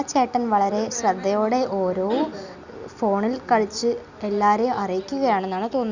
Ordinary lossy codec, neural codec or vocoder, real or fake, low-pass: Opus, 64 kbps; none; real; 7.2 kHz